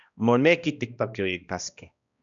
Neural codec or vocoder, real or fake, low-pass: codec, 16 kHz, 1 kbps, X-Codec, HuBERT features, trained on balanced general audio; fake; 7.2 kHz